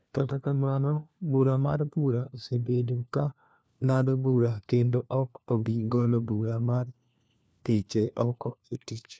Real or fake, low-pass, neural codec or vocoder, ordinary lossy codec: fake; none; codec, 16 kHz, 1 kbps, FunCodec, trained on LibriTTS, 50 frames a second; none